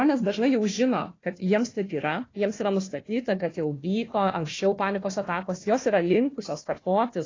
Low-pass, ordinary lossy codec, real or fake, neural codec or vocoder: 7.2 kHz; AAC, 32 kbps; fake; codec, 16 kHz, 1 kbps, FunCodec, trained on Chinese and English, 50 frames a second